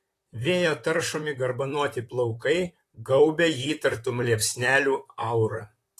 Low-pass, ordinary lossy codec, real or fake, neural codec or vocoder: 14.4 kHz; AAC, 48 kbps; fake; vocoder, 44.1 kHz, 128 mel bands, Pupu-Vocoder